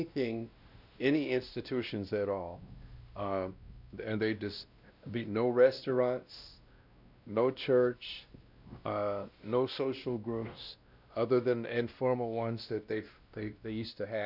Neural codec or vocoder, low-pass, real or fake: codec, 16 kHz, 1 kbps, X-Codec, WavLM features, trained on Multilingual LibriSpeech; 5.4 kHz; fake